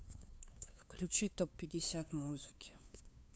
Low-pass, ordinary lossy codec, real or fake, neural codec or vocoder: none; none; fake; codec, 16 kHz, 2 kbps, FunCodec, trained on LibriTTS, 25 frames a second